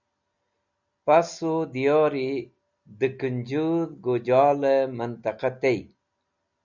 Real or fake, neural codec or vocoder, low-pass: real; none; 7.2 kHz